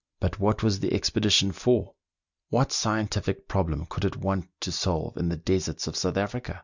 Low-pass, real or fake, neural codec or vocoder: 7.2 kHz; real; none